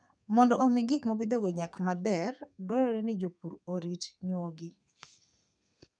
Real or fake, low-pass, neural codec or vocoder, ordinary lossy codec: fake; 9.9 kHz; codec, 32 kHz, 1.9 kbps, SNAC; none